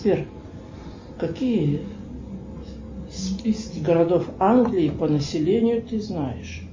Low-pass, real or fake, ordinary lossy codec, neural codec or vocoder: 7.2 kHz; real; MP3, 32 kbps; none